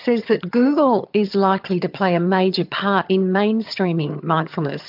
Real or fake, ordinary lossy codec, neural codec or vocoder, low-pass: fake; MP3, 48 kbps; vocoder, 22.05 kHz, 80 mel bands, HiFi-GAN; 5.4 kHz